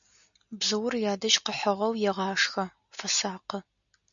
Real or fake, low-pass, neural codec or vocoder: real; 7.2 kHz; none